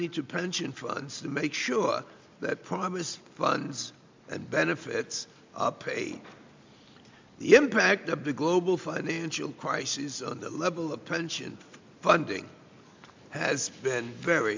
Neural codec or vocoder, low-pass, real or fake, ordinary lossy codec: none; 7.2 kHz; real; MP3, 64 kbps